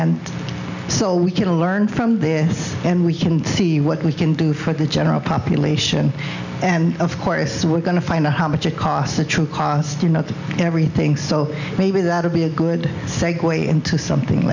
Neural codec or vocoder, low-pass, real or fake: none; 7.2 kHz; real